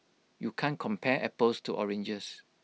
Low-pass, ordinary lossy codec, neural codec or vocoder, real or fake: none; none; none; real